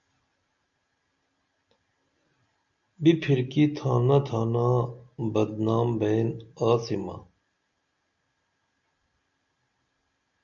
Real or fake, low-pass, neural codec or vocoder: real; 7.2 kHz; none